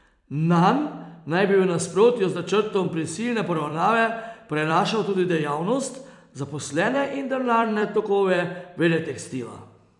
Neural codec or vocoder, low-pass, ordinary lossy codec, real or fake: vocoder, 44.1 kHz, 128 mel bands every 256 samples, BigVGAN v2; 10.8 kHz; none; fake